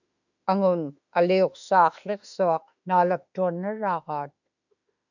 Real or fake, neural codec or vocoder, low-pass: fake; autoencoder, 48 kHz, 32 numbers a frame, DAC-VAE, trained on Japanese speech; 7.2 kHz